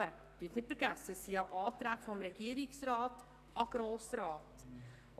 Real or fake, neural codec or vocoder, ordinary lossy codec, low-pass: fake; codec, 44.1 kHz, 2.6 kbps, SNAC; none; 14.4 kHz